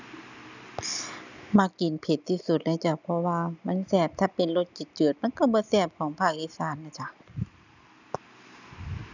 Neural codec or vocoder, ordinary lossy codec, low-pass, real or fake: none; none; 7.2 kHz; real